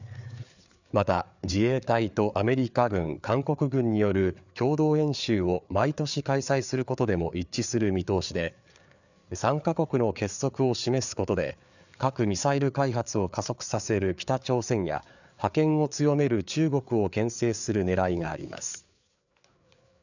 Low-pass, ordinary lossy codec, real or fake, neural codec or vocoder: 7.2 kHz; none; fake; codec, 16 kHz, 4 kbps, FreqCodec, larger model